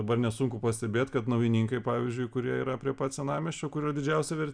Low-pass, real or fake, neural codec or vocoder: 9.9 kHz; real; none